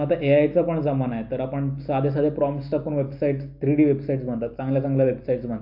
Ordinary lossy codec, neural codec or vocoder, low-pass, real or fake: AAC, 48 kbps; none; 5.4 kHz; real